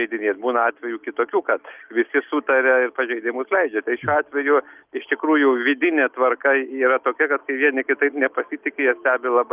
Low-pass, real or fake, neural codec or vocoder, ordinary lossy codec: 3.6 kHz; real; none; Opus, 24 kbps